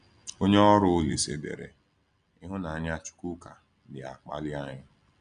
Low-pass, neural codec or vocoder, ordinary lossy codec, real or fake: 9.9 kHz; none; none; real